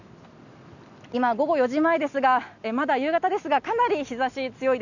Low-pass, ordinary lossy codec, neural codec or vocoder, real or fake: 7.2 kHz; none; none; real